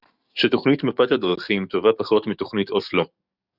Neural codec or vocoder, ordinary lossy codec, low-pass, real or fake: codec, 44.1 kHz, 7.8 kbps, DAC; Opus, 64 kbps; 5.4 kHz; fake